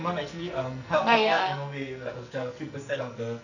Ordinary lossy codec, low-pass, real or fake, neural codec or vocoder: none; 7.2 kHz; fake; codec, 44.1 kHz, 2.6 kbps, SNAC